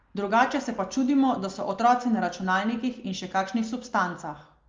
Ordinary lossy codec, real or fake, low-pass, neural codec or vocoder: Opus, 32 kbps; real; 7.2 kHz; none